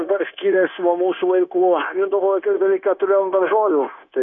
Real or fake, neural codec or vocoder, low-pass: fake; codec, 16 kHz, 0.9 kbps, LongCat-Audio-Codec; 7.2 kHz